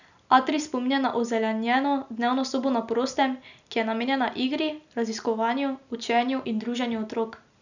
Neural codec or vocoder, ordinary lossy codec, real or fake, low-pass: none; none; real; 7.2 kHz